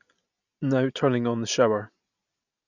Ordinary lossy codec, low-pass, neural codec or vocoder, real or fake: none; 7.2 kHz; none; real